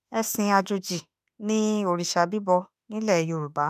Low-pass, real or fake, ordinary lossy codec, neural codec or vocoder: 14.4 kHz; fake; none; autoencoder, 48 kHz, 32 numbers a frame, DAC-VAE, trained on Japanese speech